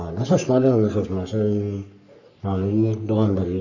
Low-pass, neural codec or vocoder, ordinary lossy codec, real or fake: 7.2 kHz; codec, 44.1 kHz, 3.4 kbps, Pupu-Codec; none; fake